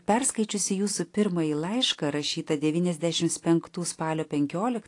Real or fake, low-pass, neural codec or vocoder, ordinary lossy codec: real; 10.8 kHz; none; AAC, 48 kbps